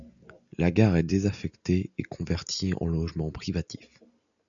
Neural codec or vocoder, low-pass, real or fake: none; 7.2 kHz; real